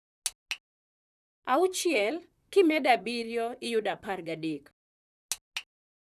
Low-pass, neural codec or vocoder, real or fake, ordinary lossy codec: 14.4 kHz; vocoder, 44.1 kHz, 128 mel bands, Pupu-Vocoder; fake; none